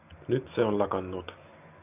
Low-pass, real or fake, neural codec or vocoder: 3.6 kHz; real; none